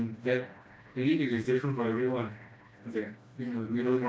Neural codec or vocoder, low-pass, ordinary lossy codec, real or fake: codec, 16 kHz, 1 kbps, FreqCodec, smaller model; none; none; fake